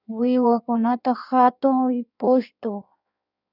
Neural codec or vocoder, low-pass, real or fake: codec, 16 kHz in and 24 kHz out, 1.1 kbps, FireRedTTS-2 codec; 5.4 kHz; fake